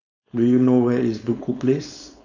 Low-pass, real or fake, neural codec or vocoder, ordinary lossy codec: 7.2 kHz; fake; codec, 16 kHz, 4.8 kbps, FACodec; none